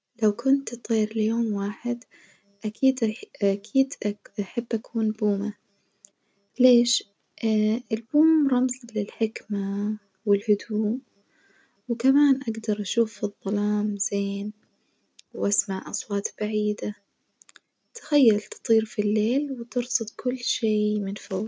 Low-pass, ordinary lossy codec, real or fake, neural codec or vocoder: none; none; real; none